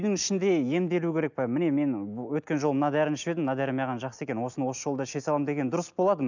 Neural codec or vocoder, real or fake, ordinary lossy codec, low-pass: none; real; none; 7.2 kHz